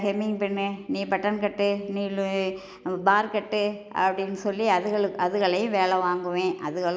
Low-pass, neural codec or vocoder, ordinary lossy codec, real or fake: none; none; none; real